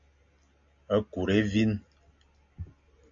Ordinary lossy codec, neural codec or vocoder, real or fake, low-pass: AAC, 64 kbps; none; real; 7.2 kHz